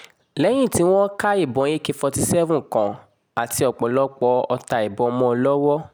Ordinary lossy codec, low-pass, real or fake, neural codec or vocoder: none; none; real; none